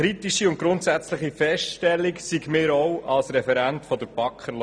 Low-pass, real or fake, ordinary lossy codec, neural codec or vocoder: 9.9 kHz; real; none; none